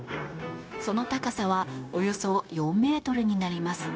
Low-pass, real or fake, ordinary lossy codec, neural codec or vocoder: none; fake; none; codec, 16 kHz, 0.9 kbps, LongCat-Audio-Codec